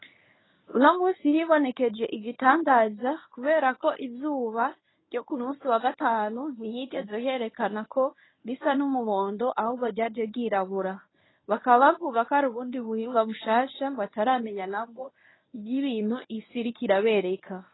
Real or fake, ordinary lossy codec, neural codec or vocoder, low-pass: fake; AAC, 16 kbps; codec, 24 kHz, 0.9 kbps, WavTokenizer, medium speech release version 1; 7.2 kHz